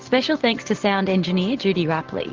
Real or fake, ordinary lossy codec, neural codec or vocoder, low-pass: real; Opus, 16 kbps; none; 7.2 kHz